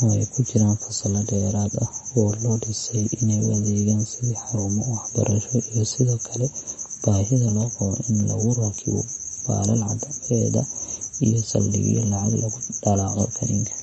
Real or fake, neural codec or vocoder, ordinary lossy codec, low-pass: real; none; MP3, 32 kbps; 10.8 kHz